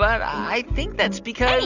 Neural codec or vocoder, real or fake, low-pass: none; real; 7.2 kHz